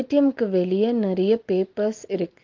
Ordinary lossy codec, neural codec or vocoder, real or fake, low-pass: Opus, 32 kbps; none; real; 7.2 kHz